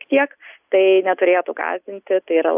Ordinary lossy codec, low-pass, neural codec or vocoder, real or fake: AAC, 32 kbps; 3.6 kHz; none; real